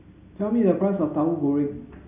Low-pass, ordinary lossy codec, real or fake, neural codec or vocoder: 3.6 kHz; MP3, 32 kbps; real; none